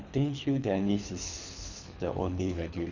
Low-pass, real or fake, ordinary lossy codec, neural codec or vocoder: 7.2 kHz; fake; none; codec, 24 kHz, 3 kbps, HILCodec